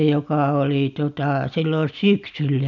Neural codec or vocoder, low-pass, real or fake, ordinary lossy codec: none; 7.2 kHz; real; none